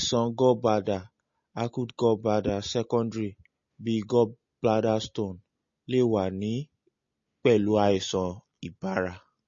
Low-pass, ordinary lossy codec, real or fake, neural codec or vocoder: 7.2 kHz; MP3, 32 kbps; real; none